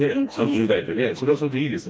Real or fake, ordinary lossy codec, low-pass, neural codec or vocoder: fake; none; none; codec, 16 kHz, 1 kbps, FreqCodec, smaller model